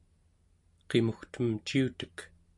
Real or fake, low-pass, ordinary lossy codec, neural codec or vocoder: real; 10.8 kHz; AAC, 64 kbps; none